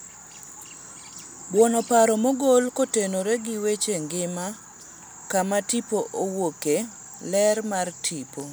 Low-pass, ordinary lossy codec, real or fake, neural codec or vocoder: none; none; real; none